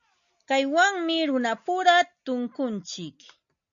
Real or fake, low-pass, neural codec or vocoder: real; 7.2 kHz; none